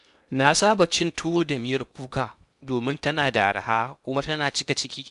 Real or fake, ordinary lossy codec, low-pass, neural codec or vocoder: fake; none; 10.8 kHz; codec, 16 kHz in and 24 kHz out, 0.8 kbps, FocalCodec, streaming, 65536 codes